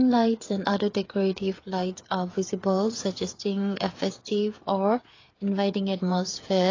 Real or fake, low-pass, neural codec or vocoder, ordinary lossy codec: fake; 7.2 kHz; codec, 16 kHz, 8 kbps, FreqCodec, smaller model; AAC, 32 kbps